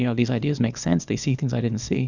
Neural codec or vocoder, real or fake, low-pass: codec, 24 kHz, 0.9 kbps, WavTokenizer, small release; fake; 7.2 kHz